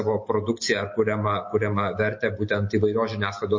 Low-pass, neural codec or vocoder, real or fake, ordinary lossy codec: 7.2 kHz; none; real; MP3, 32 kbps